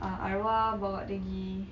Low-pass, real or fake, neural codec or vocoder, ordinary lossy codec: 7.2 kHz; real; none; none